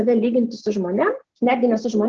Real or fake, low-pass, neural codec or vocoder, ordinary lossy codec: real; 7.2 kHz; none; Opus, 16 kbps